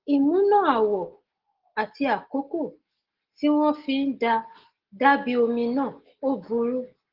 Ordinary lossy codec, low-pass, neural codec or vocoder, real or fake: Opus, 16 kbps; 5.4 kHz; none; real